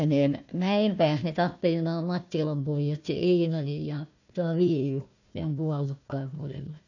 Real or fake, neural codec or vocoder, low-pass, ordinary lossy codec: fake; codec, 16 kHz, 1 kbps, FunCodec, trained on Chinese and English, 50 frames a second; 7.2 kHz; none